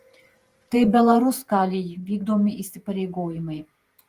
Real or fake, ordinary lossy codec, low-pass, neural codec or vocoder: fake; Opus, 24 kbps; 14.4 kHz; vocoder, 48 kHz, 128 mel bands, Vocos